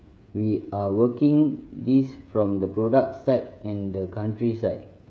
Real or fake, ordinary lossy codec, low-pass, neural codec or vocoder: fake; none; none; codec, 16 kHz, 8 kbps, FreqCodec, smaller model